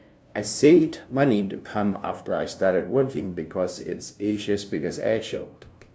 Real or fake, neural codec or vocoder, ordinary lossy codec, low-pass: fake; codec, 16 kHz, 0.5 kbps, FunCodec, trained on LibriTTS, 25 frames a second; none; none